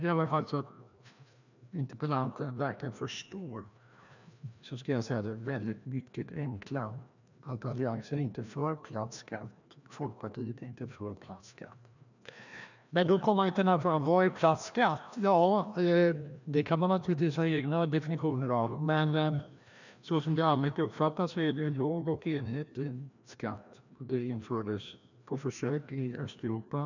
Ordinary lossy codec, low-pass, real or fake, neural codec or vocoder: none; 7.2 kHz; fake; codec, 16 kHz, 1 kbps, FreqCodec, larger model